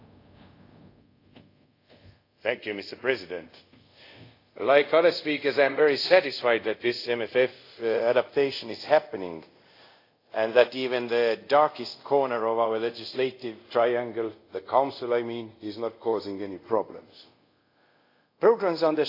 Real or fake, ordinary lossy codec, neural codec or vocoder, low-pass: fake; AAC, 32 kbps; codec, 24 kHz, 0.5 kbps, DualCodec; 5.4 kHz